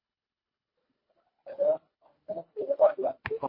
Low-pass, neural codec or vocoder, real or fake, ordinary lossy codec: 5.4 kHz; codec, 24 kHz, 1.5 kbps, HILCodec; fake; MP3, 24 kbps